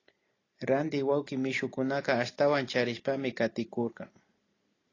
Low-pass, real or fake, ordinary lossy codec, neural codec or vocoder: 7.2 kHz; real; AAC, 32 kbps; none